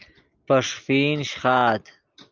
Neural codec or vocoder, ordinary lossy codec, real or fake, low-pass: none; Opus, 24 kbps; real; 7.2 kHz